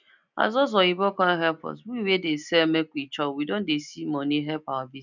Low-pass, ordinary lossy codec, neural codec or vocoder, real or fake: 7.2 kHz; none; none; real